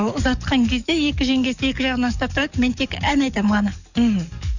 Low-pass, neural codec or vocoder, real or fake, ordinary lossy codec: 7.2 kHz; codec, 44.1 kHz, 7.8 kbps, DAC; fake; none